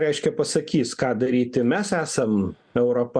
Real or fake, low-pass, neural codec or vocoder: real; 9.9 kHz; none